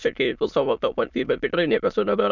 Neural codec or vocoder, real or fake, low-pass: autoencoder, 22.05 kHz, a latent of 192 numbers a frame, VITS, trained on many speakers; fake; 7.2 kHz